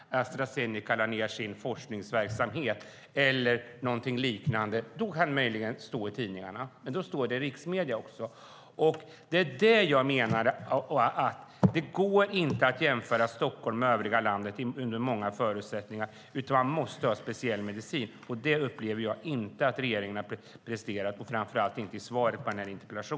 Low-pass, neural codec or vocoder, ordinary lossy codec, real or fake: none; none; none; real